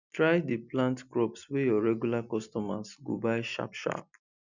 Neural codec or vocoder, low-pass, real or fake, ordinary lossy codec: none; 7.2 kHz; real; none